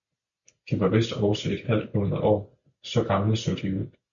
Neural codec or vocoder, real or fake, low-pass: none; real; 7.2 kHz